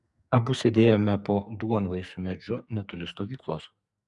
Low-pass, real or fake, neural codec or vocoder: 10.8 kHz; fake; codec, 44.1 kHz, 2.6 kbps, SNAC